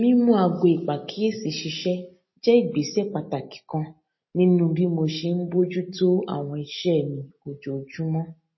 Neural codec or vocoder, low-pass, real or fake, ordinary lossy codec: none; 7.2 kHz; real; MP3, 24 kbps